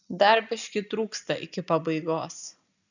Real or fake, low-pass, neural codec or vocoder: real; 7.2 kHz; none